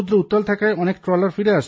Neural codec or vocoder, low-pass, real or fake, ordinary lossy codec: none; none; real; none